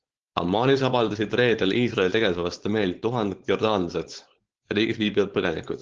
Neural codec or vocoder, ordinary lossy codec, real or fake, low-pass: codec, 16 kHz, 4.8 kbps, FACodec; Opus, 16 kbps; fake; 7.2 kHz